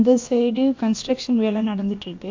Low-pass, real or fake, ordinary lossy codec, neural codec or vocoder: 7.2 kHz; fake; AAC, 48 kbps; codec, 16 kHz, about 1 kbps, DyCAST, with the encoder's durations